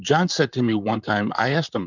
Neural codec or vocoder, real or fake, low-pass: vocoder, 44.1 kHz, 128 mel bands, Pupu-Vocoder; fake; 7.2 kHz